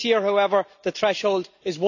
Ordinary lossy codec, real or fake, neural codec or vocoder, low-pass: none; real; none; 7.2 kHz